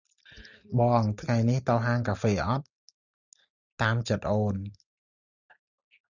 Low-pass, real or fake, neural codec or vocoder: 7.2 kHz; real; none